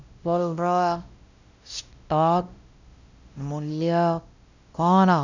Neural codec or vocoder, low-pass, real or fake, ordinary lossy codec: codec, 16 kHz, 0.5 kbps, X-Codec, WavLM features, trained on Multilingual LibriSpeech; 7.2 kHz; fake; none